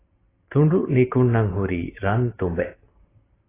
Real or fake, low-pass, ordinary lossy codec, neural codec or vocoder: real; 3.6 kHz; AAC, 16 kbps; none